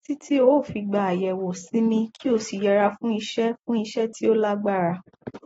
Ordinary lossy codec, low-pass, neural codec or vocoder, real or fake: AAC, 24 kbps; 19.8 kHz; none; real